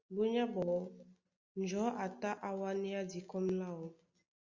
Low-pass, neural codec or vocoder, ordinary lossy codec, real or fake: 7.2 kHz; none; Opus, 64 kbps; real